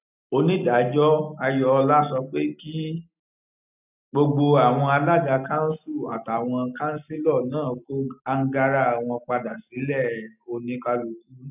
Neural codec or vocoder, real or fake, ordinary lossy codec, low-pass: none; real; none; 3.6 kHz